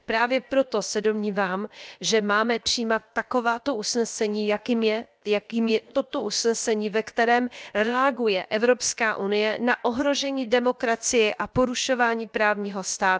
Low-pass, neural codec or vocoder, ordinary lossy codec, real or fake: none; codec, 16 kHz, about 1 kbps, DyCAST, with the encoder's durations; none; fake